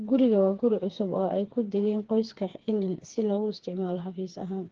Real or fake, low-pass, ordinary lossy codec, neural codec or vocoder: fake; 7.2 kHz; Opus, 16 kbps; codec, 16 kHz, 4 kbps, FreqCodec, smaller model